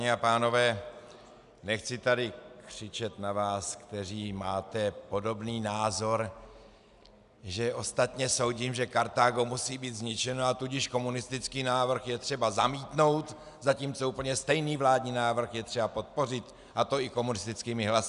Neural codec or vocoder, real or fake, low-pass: none; real; 10.8 kHz